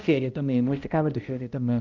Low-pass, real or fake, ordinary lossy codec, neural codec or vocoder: 7.2 kHz; fake; Opus, 24 kbps; codec, 16 kHz, 0.5 kbps, X-Codec, HuBERT features, trained on balanced general audio